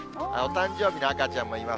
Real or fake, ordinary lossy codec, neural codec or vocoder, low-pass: real; none; none; none